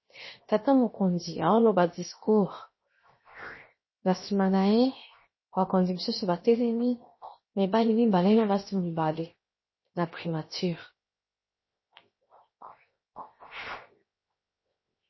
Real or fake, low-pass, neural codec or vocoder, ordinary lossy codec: fake; 7.2 kHz; codec, 16 kHz, 0.7 kbps, FocalCodec; MP3, 24 kbps